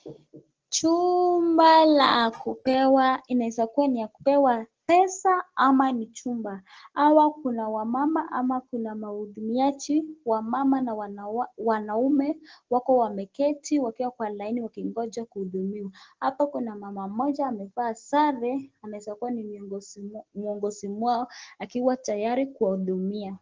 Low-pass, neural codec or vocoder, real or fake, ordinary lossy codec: 7.2 kHz; none; real; Opus, 16 kbps